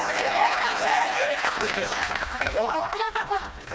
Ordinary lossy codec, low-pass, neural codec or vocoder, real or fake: none; none; codec, 16 kHz, 1 kbps, FreqCodec, smaller model; fake